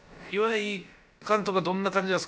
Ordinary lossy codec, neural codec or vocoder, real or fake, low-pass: none; codec, 16 kHz, about 1 kbps, DyCAST, with the encoder's durations; fake; none